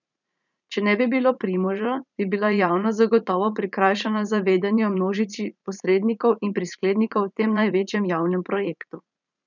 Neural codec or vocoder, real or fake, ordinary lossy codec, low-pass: vocoder, 44.1 kHz, 80 mel bands, Vocos; fake; none; 7.2 kHz